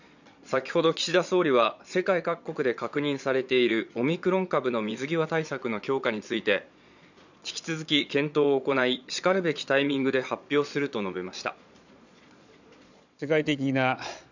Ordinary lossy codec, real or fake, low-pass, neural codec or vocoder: none; fake; 7.2 kHz; vocoder, 22.05 kHz, 80 mel bands, Vocos